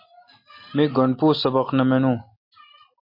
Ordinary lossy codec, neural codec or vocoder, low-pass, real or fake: MP3, 32 kbps; none; 5.4 kHz; real